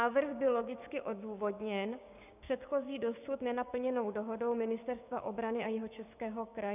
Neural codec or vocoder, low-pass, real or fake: codec, 16 kHz, 6 kbps, DAC; 3.6 kHz; fake